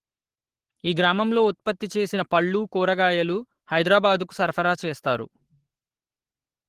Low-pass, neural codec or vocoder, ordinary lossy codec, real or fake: 14.4 kHz; codec, 44.1 kHz, 7.8 kbps, Pupu-Codec; Opus, 16 kbps; fake